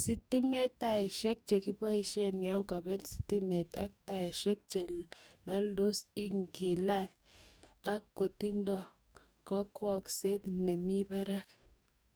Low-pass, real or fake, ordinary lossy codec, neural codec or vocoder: none; fake; none; codec, 44.1 kHz, 2.6 kbps, DAC